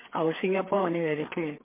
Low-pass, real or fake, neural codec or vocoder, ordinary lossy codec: 3.6 kHz; fake; codec, 16 kHz, 4 kbps, FreqCodec, larger model; MP3, 32 kbps